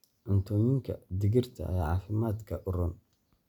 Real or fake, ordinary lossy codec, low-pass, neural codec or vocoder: real; none; 19.8 kHz; none